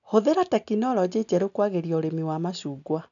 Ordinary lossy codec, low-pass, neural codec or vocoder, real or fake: none; 7.2 kHz; none; real